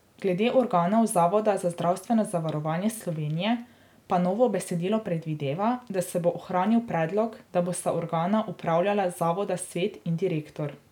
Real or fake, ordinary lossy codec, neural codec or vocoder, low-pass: real; none; none; 19.8 kHz